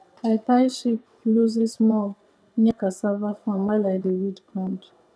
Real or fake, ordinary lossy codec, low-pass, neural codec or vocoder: fake; none; none; vocoder, 22.05 kHz, 80 mel bands, WaveNeXt